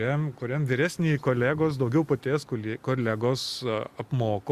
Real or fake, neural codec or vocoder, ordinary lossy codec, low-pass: real; none; Opus, 64 kbps; 14.4 kHz